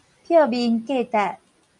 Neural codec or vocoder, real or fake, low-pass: none; real; 10.8 kHz